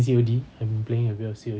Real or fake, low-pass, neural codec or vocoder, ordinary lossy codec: real; none; none; none